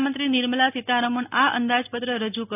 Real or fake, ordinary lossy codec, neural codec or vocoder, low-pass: real; none; none; 3.6 kHz